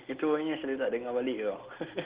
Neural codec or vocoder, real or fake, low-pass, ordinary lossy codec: none; real; 3.6 kHz; Opus, 16 kbps